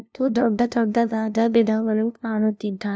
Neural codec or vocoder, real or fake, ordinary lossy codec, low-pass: codec, 16 kHz, 0.5 kbps, FunCodec, trained on LibriTTS, 25 frames a second; fake; none; none